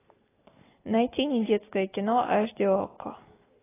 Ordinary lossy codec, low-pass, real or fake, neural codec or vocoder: AAC, 16 kbps; 3.6 kHz; real; none